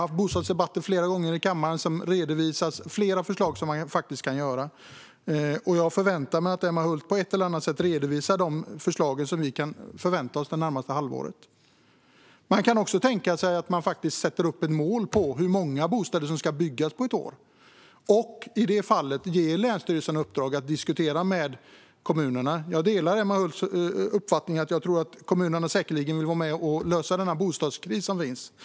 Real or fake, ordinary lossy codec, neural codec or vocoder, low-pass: real; none; none; none